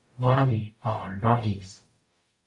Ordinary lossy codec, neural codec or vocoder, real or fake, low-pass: MP3, 48 kbps; codec, 44.1 kHz, 0.9 kbps, DAC; fake; 10.8 kHz